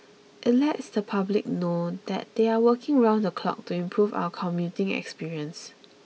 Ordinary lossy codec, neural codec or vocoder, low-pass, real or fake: none; none; none; real